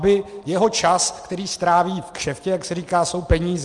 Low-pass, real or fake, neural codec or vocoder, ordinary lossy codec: 10.8 kHz; real; none; Opus, 32 kbps